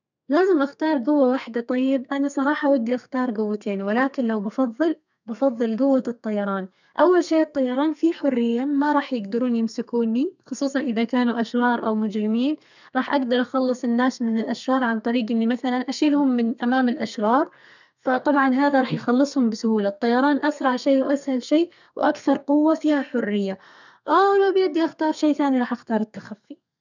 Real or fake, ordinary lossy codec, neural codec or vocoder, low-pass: fake; none; codec, 32 kHz, 1.9 kbps, SNAC; 7.2 kHz